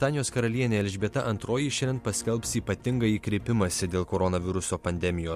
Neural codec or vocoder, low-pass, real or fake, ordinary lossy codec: none; 14.4 kHz; real; MP3, 64 kbps